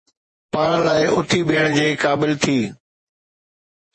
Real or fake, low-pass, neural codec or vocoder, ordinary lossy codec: fake; 10.8 kHz; vocoder, 48 kHz, 128 mel bands, Vocos; MP3, 32 kbps